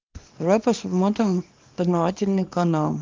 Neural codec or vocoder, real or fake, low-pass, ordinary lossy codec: codec, 24 kHz, 0.9 kbps, WavTokenizer, small release; fake; 7.2 kHz; Opus, 24 kbps